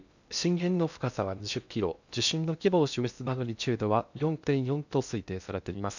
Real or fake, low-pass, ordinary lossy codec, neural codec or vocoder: fake; 7.2 kHz; none; codec, 16 kHz in and 24 kHz out, 0.6 kbps, FocalCodec, streaming, 2048 codes